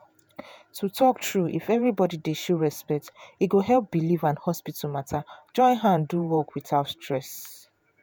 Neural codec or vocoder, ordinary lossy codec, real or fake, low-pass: vocoder, 48 kHz, 128 mel bands, Vocos; none; fake; none